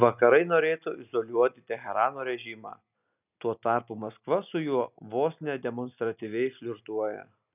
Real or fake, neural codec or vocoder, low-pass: real; none; 3.6 kHz